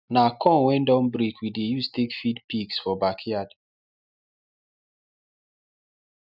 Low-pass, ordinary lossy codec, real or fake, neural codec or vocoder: 5.4 kHz; none; real; none